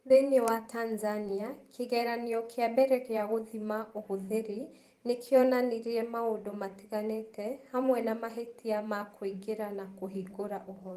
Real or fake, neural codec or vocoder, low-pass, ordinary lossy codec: fake; vocoder, 44.1 kHz, 128 mel bands every 512 samples, BigVGAN v2; 14.4 kHz; Opus, 24 kbps